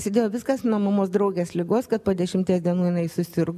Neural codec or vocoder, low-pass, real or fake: none; 14.4 kHz; real